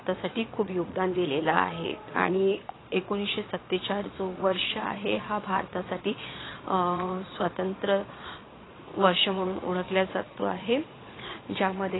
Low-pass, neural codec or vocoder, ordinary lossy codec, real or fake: 7.2 kHz; vocoder, 22.05 kHz, 80 mel bands, Vocos; AAC, 16 kbps; fake